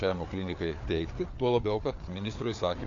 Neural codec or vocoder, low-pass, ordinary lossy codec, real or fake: codec, 16 kHz, 4 kbps, FreqCodec, larger model; 7.2 kHz; AAC, 48 kbps; fake